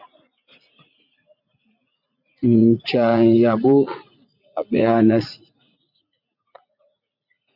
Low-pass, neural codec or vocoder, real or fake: 5.4 kHz; vocoder, 44.1 kHz, 80 mel bands, Vocos; fake